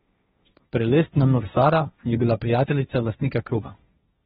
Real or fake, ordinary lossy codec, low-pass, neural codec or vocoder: fake; AAC, 16 kbps; 7.2 kHz; codec, 16 kHz, 1.1 kbps, Voila-Tokenizer